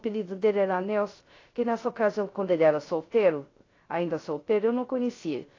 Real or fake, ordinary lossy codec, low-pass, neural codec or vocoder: fake; AAC, 32 kbps; 7.2 kHz; codec, 16 kHz, 0.3 kbps, FocalCodec